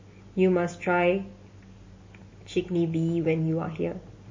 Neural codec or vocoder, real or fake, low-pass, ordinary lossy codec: none; real; 7.2 kHz; MP3, 32 kbps